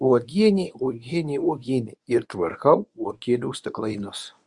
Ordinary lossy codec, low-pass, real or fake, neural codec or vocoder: Opus, 64 kbps; 10.8 kHz; fake; codec, 24 kHz, 0.9 kbps, WavTokenizer, medium speech release version 1